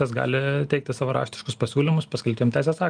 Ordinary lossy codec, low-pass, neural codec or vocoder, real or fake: Opus, 32 kbps; 9.9 kHz; none; real